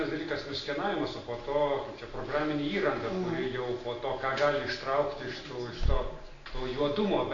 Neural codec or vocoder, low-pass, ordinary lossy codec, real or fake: none; 7.2 kHz; AAC, 32 kbps; real